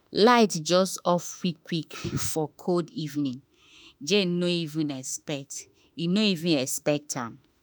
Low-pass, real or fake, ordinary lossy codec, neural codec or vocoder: none; fake; none; autoencoder, 48 kHz, 32 numbers a frame, DAC-VAE, trained on Japanese speech